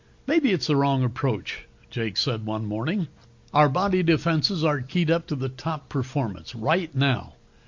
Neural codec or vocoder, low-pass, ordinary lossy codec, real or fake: none; 7.2 kHz; MP3, 48 kbps; real